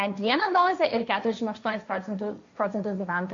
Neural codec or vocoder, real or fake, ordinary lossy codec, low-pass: codec, 16 kHz, 1.1 kbps, Voila-Tokenizer; fake; MP3, 48 kbps; 7.2 kHz